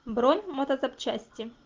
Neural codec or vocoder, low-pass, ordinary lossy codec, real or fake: none; 7.2 kHz; Opus, 24 kbps; real